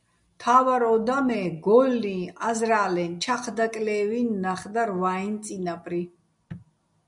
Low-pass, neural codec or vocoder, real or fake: 10.8 kHz; none; real